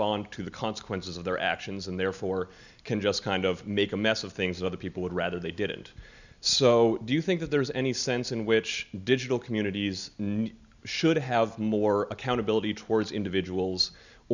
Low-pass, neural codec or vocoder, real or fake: 7.2 kHz; none; real